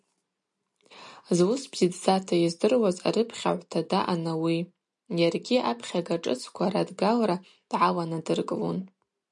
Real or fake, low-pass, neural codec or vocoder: real; 10.8 kHz; none